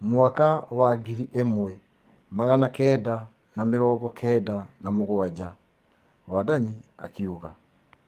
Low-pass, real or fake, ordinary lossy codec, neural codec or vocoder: 14.4 kHz; fake; Opus, 32 kbps; codec, 44.1 kHz, 2.6 kbps, SNAC